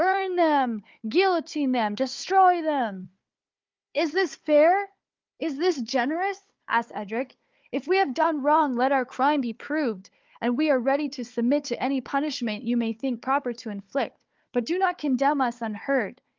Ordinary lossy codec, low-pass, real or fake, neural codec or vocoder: Opus, 32 kbps; 7.2 kHz; fake; codec, 16 kHz, 4 kbps, FunCodec, trained on Chinese and English, 50 frames a second